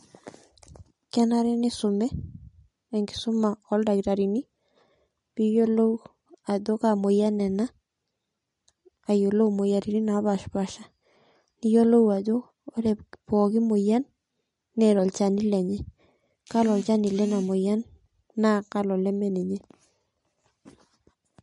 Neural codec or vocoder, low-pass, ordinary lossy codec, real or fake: none; 19.8 kHz; MP3, 48 kbps; real